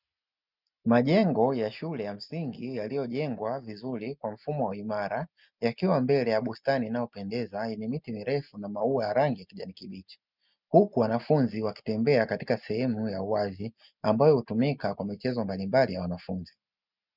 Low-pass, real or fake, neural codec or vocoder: 5.4 kHz; real; none